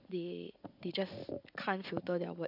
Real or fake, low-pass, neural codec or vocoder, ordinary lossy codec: fake; 5.4 kHz; vocoder, 44.1 kHz, 80 mel bands, Vocos; none